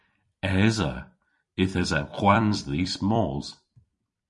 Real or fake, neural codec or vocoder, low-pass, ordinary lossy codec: fake; vocoder, 44.1 kHz, 128 mel bands every 256 samples, BigVGAN v2; 10.8 kHz; MP3, 48 kbps